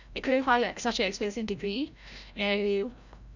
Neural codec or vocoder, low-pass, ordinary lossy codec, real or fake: codec, 16 kHz, 0.5 kbps, FreqCodec, larger model; 7.2 kHz; none; fake